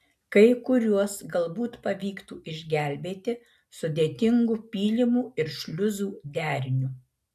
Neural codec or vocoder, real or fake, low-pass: none; real; 14.4 kHz